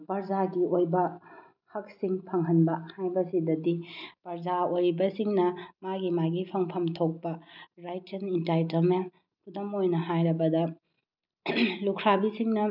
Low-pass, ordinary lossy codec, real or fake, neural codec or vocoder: 5.4 kHz; none; real; none